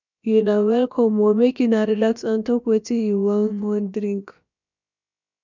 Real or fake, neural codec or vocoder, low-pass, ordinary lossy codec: fake; codec, 16 kHz, about 1 kbps, DyCAST, with the encoder's durations; 7.2 kHz; none